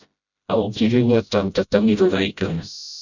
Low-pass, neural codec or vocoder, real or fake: 7.2 kHz; codec, 16 kHz, 0.5 kbps, FreqCodec, smaller model; fake